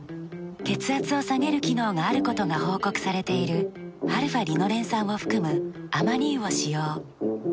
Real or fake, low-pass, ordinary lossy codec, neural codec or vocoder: real; none; none; none